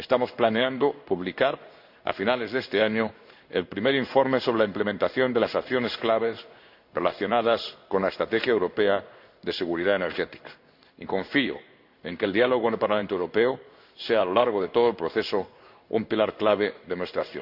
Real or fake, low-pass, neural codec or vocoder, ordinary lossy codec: fake; 5.4 kHz; codec, 16 kHz in and 24 kHz out, 1 kbps, XY-Tokenizer; none